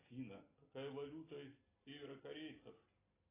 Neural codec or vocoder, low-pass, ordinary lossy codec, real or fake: none; 3.6 kHz; AAC, 16 kbps; real